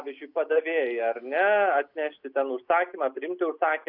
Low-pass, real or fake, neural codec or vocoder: 5.4 kHz; real; none